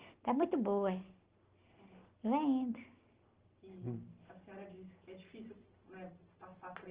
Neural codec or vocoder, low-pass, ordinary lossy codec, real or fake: none; 3.6 kHz; Opus, 16 kbps; real